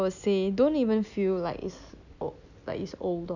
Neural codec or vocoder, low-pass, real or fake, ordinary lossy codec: none; 7.2 kHz; real; none